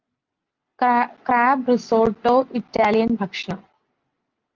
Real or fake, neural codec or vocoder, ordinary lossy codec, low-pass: real; none; Opus, 32 kbps; 7.2 kHz